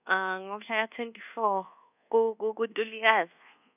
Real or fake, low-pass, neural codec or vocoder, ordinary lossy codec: fake; 3.6 kHz; codec, 24 kHz, 1.2 kbps, DualCodec; none